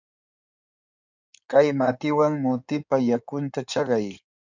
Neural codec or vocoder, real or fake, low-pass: codec, 16 kHz in and 24 kHz out, 2.2 kbps, FireRedTTS-2 codec; fake; 7.2 kHz